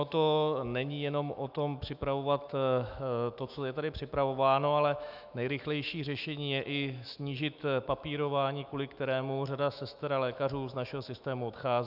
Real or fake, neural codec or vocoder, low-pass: fake; autoencoder, 48 kHz, 128 numbers a frame, DAC-VAE, trained on Japanese speech; 5.4 kHz